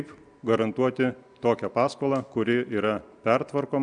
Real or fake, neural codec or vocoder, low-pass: real; none; 9.9 kHz